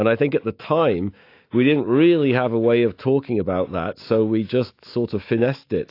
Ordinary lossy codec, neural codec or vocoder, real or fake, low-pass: AAC, 32 kbps; autoencoder, 48 kHz, 128 numbers a frame, DAC-VAE, trained on Japanese speech; fake; 5.4 kHz